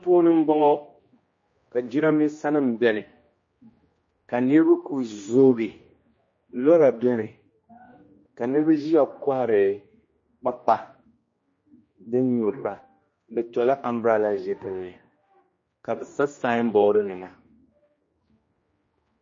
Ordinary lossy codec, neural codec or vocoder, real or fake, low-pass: MP3, 32 kbps; codec, 16 kHz, 1 kbps, X-Codec, HuBERT features, trained on general audio; fake; 7.2 kHz